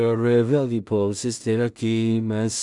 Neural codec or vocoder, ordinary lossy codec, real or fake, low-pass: codec, 16 kHz in and 24 kHz out, 0.4 kbps, LongCat-Audio-Codec, two codebook decoder; MP3, 96 kbps; fake; 10.8 kHz